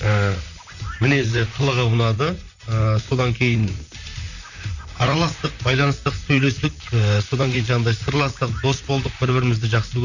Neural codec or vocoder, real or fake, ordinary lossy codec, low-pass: vocoder, 44.1 kHz, 128 mel bands, Pupu-Vocoder; fake; none; 7.2 kHz